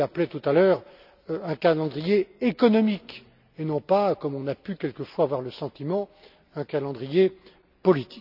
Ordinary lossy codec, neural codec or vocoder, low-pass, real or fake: none; none; 5.4 kHz; real